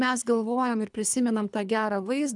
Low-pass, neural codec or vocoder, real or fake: 10.8 kHz; codec, 24 kHz, 3 kbps, HILCodec; fake